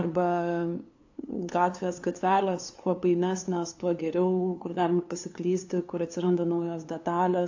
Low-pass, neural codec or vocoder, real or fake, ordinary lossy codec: 7.2 kHz; codec, 16 kHz, 2 kbps, FunCodec, trained on LibriTTS, 25 frames a second; fake; AAC, 48 kbps